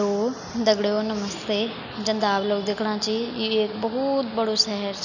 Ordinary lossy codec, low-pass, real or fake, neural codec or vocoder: none; 7.2 kHz; real; none